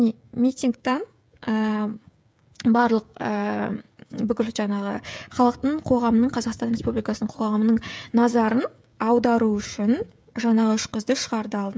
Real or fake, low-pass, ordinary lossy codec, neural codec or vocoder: fake; none; none; codec, 16 kHz, 16 kbps, FreqCodec, smaller model